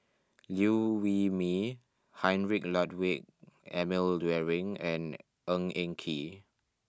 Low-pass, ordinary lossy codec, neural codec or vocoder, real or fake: none; none; none; real